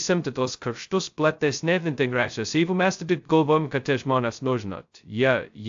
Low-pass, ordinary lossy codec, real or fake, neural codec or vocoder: 7.2 kHz; AAC, 64 kbps; fake; codec, 16 kHz, 0.2 kbps, FocalCodec